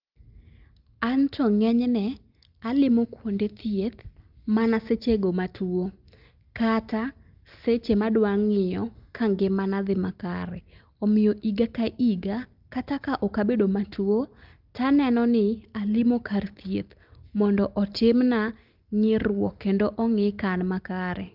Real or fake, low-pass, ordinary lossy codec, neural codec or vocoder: real; 5.4 kHz; Opus, 24 kbps; none